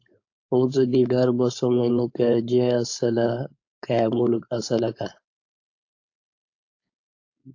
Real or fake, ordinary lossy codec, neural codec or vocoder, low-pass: fake; AAC, 48 kbps; codec, 16 kHz, 4.8 kbps, FACodec; 7.2 kHz